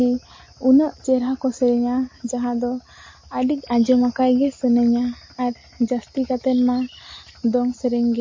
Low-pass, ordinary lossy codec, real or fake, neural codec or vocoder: 7.2 kHz; MP3, 32 kbps; real; none